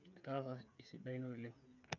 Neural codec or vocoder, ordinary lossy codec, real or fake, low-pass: codec, 16 kHz, 8 kbps, FreqCodec, smaller model; none; fake; 7.2 kHz